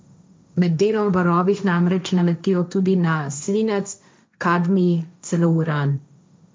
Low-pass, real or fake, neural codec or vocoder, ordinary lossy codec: none; fake; codec, 16 kHz, 1.1 kbps, Voila-Tokenizer; none